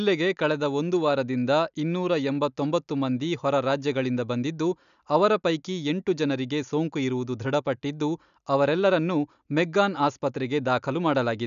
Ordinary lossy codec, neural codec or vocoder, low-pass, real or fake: none; none; 7.2 kHz; real